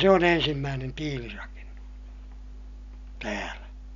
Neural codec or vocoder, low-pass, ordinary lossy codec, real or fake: none; 7.2 kHz; none; real